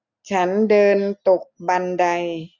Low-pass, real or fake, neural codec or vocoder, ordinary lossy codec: 7.2 kHz; real; none; none